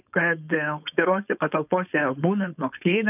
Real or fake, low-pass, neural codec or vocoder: fake; 3.6 kHz; codec, 16 kHz, 4.8 kbps, FACodec